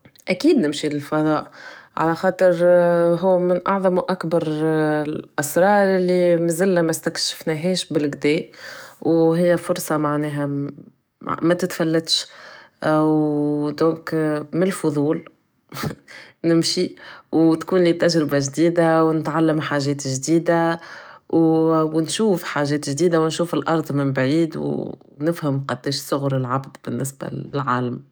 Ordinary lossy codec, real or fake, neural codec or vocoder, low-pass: none; fake; codec, 44.1 kHz, 7.8 kbps, DAC; none